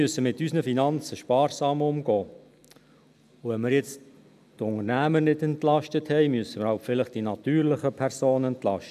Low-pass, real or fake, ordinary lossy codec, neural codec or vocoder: 14.4 kHz; real; none; none